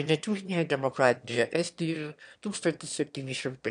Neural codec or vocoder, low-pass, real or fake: autoencoder, 22.05 kHz, a latent of 192 numbers a frame, VITS, trained on one speaker; 9.9 kHz; fake